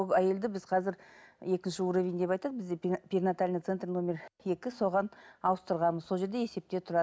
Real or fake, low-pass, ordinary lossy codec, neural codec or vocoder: real; none; none; none